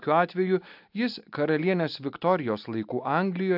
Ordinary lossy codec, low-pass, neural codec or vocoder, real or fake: AAC, 48 kbps; 5.4 kHz; none; real